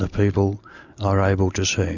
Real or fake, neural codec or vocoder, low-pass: real; none; 7.2 kHz